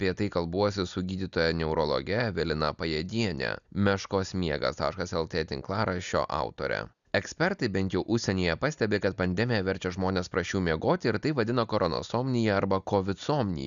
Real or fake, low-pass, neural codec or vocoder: real; 7.2 kHz; none